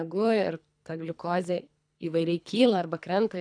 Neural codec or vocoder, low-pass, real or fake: codec, 24 kHz, 3 kbps, HILCodec; 9.9 kHz; fake